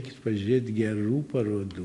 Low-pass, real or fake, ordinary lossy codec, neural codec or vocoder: 10.8 kHz; real; MP3, 64 kbps; none